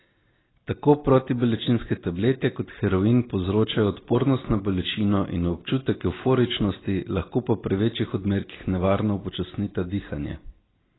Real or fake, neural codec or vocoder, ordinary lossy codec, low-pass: real; none; AAC, 16 kbps; 7.2 kHz